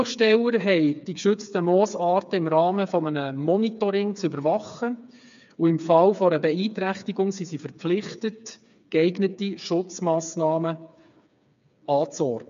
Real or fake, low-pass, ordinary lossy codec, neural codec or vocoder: fake; 7.2 kHz; MP3, 64 kbps; codec, 16 kHz, 4 kbps, FreqCodec, smaller model